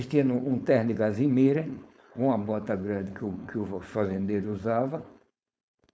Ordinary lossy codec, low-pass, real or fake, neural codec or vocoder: none; none; fake; codec, 16 kHz, 4.8 kbps, FACodec